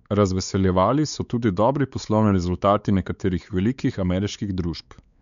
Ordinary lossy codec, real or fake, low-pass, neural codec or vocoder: none; fake; 7.2 kHz; codec, 16 kHz, 4 kbps, X-Codec, WavLM features, trained on Multilingual LibriSpeech